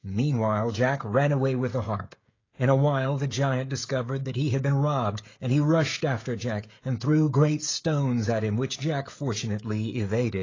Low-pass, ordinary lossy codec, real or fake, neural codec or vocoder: 7.2 kHz; AAC, 32 kbps; fake; codec, 16 kHz, 16 kbps, FreqCodec, smaller model